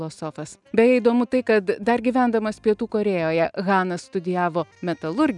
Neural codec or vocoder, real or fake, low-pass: none; real; 10.8 kHz